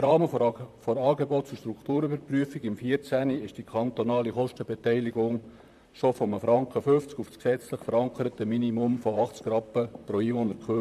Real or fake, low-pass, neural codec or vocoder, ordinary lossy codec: fake; 14.4 kHz; vocoder, 44.1 kHz, 128 mel bands, Pupu-Vocoder; MP3, 96 kbps